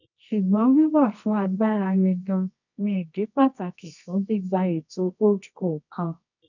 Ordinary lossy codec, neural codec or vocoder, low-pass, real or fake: none; codec, 24 kHz, 0.9 kbps, WavTokenizer, medium music audio release; 7.2 kHz; fake